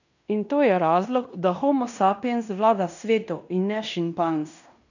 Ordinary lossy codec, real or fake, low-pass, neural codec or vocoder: none; fake; 7.2 kHz; codec, 16 kHz in and 24 kHz out, 0.9 kbps, LongCat-Audio-Codec, fine tuned four codebook decoder